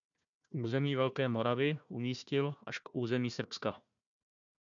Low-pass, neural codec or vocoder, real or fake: 7.2 kHz; codec, 16 kHz, 1 kbps, FunCodec, trained on Chinese and English, 50 frames a second; fake